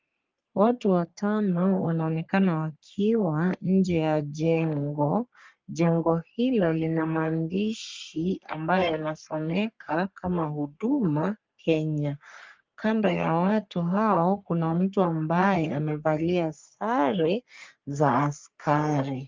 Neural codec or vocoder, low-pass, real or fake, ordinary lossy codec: codec, 44.1 kHz, 3.4 kbps, Pupu-Codec; 7.2 kHz; fake; Opus, 24 kbps